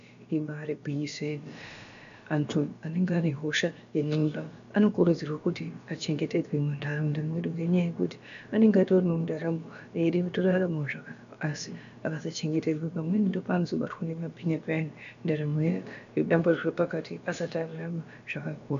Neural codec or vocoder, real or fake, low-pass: codec, 16 kHz, about 1 kbps, DyCAST, with the encoder's durations; fake; 7.2 kHz